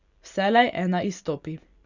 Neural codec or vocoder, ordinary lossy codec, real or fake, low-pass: none; Opus, 64 kbps; real; 7.2 kHz